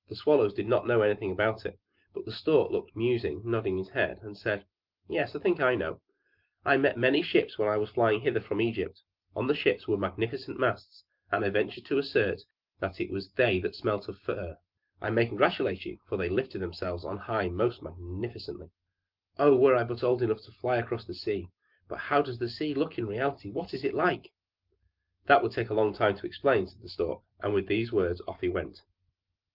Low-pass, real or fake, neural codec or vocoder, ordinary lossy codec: 5.4 kHz; real; none; Opus, 32 kbps